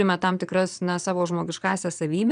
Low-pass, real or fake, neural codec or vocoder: 9.9 kHz; fake; vocoder, 22.05 kHz, 80 mel bands, Vocos